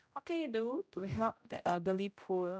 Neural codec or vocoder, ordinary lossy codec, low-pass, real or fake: codec, 16 kHz, 0.5 kbps, X-Codec, HuBERT features, trained on general audio; none; none; fake